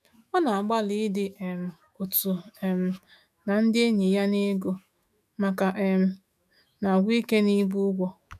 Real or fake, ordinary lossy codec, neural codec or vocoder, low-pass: fake; none; autoencoder, 48 kHz, 128 numbers a frame, DAC-VAE, trained on Japanese speech; 14.4 kHz